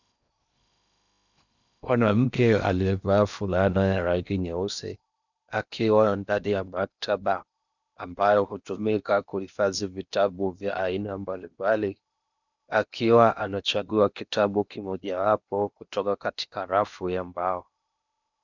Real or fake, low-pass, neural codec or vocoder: fake; 7.2 kHz; codec, 16 kHz in and 24 kHz out, 0.8 kbps, FocalCodec, streaming, 65536 codes